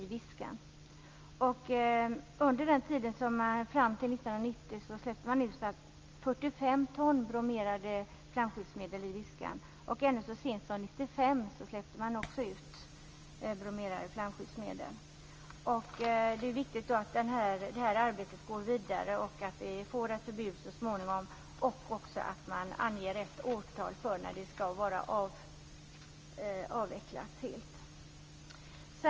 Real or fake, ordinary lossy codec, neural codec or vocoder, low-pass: real; Opus, 24 kbps; none; 7.2 kHz